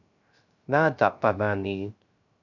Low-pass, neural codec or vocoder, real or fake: 7.2 kHz; codec, 16 kHz, 0.3 kbps, FocalCodec; fake